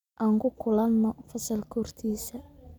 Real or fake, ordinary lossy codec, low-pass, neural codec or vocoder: real; none; 19.8 kHz; none